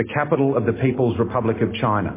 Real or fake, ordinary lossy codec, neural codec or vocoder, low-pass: real; MP3, 16 kbps; none; 3.6 kHz